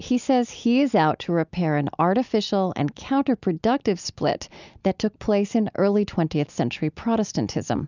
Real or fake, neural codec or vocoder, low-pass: real; none; 7.2 kHz